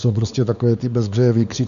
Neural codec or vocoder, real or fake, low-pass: codec, 16 kHz, 4 kbps, X-Codec, WavLM features, trained on Multilingual LibriSpeech; fake; 7.2 kHz